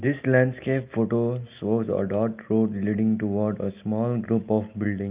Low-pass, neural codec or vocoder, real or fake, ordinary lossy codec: 3.6 kHz; none; real; Opus, 24 kbps